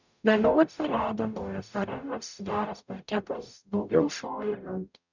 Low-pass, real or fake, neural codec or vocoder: 7.2 kHz; fake; codec, 44.1 kHz, 0.9 kbps, DAC